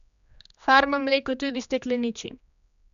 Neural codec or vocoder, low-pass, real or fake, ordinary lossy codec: codec, 16 kHz, 2 kbps, X-Codec, HuBERT features, trained on general audio; 7.2 kHz; fake; none